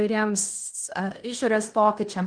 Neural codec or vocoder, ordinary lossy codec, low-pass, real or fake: codec, 16 kHz in and 24 kHz out, 0.9 kbps, LongCat-Audio-Codec, fine tuned four codebook decoder; Opus, 24 kbps; 9.9 kHz; fake